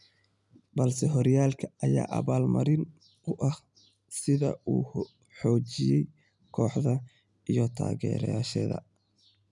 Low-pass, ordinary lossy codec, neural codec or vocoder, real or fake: 10.8 kHz; none; none; real